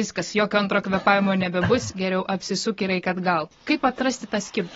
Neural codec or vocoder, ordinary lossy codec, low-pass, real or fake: none; AAC, 24 kbps; 7.2 kHz; real